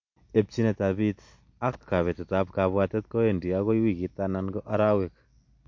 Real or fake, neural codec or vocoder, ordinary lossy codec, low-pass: real; none; MP3, 48 kbps; 7.2 kHz